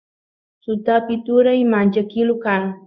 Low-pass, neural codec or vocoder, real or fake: 7.2 kHz; codec, 16 kHz in and 24 kHz out, 1 kbps, XY-Tokenizer; fake